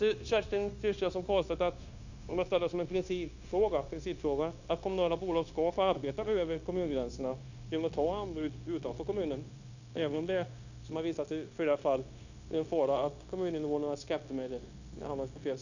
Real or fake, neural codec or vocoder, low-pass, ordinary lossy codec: fake; codec, 16 kHz, 0.9 kbps, LongCat-Audio-Codec; 7.2 kHz; none